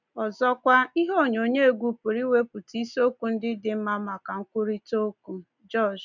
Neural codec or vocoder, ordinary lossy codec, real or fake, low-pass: none; none; real; 7.2 kHz